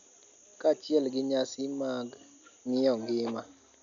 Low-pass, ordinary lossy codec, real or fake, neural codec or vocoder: 7.2 kHz; none; real; none